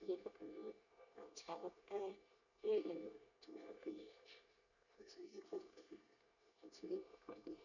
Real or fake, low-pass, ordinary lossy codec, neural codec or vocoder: fake; 7.2 kHz; none; codec, 24 kHz, 1 kbps, SNAC